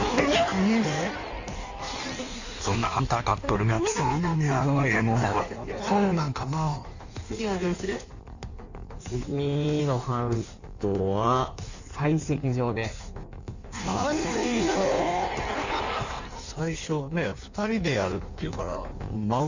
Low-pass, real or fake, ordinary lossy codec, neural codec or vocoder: 7.2 kHz; fake; none; codec, 16 kHz in and 24 kHz out, 1.1 kbps, FireRedTTS-2 codec